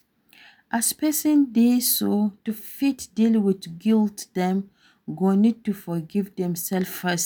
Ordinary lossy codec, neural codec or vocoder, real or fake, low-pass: none; none; real; none